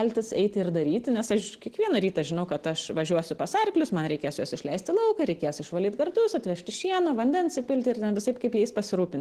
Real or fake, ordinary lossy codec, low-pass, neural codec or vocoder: real; Opus, 16 kbps; 14.4 kHz; none